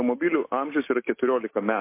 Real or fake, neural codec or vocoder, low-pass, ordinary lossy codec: real; none; 3.6 kHz; MP3, 24 kbps